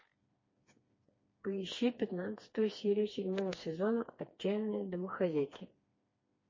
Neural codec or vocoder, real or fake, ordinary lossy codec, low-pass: codec, 16 kHz, 2 kbps, FreqCodec, smaller model; fake; MP3, 32 kbps; 7.2 kHz